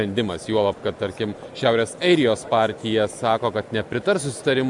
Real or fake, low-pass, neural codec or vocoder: real; 10.8 kHz; none